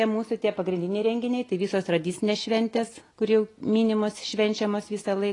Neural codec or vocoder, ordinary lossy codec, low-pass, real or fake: none; AAC, 32 kbps; 10.8 kHz; real